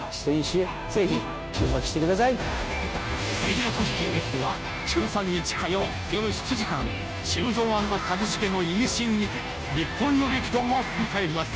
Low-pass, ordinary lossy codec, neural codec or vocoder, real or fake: none; none; codec, 16 kHz, 0.5 kbps, FunCodec, trained on Chinese and English, 25 frames a second; fake